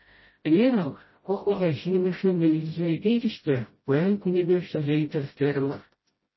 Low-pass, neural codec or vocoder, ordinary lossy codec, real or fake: 7.2 kHz; codec, 16 kHz, 0.5 kbps, FreqCodec, smaller model; MP3, 24 kbps; fake